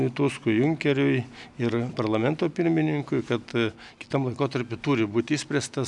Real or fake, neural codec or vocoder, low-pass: real; none; 10.8 kHz